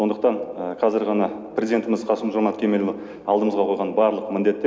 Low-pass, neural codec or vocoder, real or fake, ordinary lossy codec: none; none; real; none